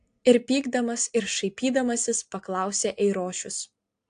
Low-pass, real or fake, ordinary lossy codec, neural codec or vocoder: 9.9 kHz; real; AAC, 64 kbps; none